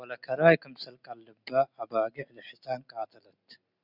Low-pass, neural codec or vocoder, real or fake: 5.4 kHz; none; real